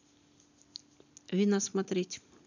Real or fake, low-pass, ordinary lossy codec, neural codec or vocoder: real; 7.2 kHz; none; none